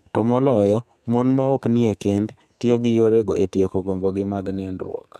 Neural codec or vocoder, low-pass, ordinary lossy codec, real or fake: codec, 32 kHz, 1.9 kbps, SNAC; 14.4 kHz; none; fake